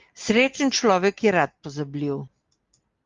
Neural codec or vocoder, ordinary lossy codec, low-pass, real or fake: none; Opus, 32 kbps; 7.2 kHz; real